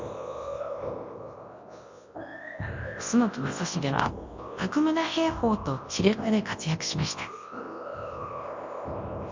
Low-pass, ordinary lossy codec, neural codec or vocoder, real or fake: 7.2 kHz; MP3, 48 kbps; codec, 24 kHz, 0.9 kbps, WavTokenizer, large speech release; fake